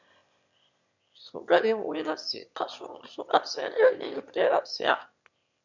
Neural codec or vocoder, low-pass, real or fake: autoencoder, 22.05 kHz, a latent of 192 numbers a frame, VITS, trained on one speaker; 7.2 kHz; fake